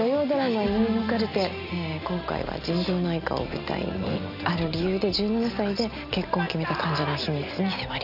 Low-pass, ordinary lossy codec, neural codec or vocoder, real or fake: 5.4 kHz; none; none; real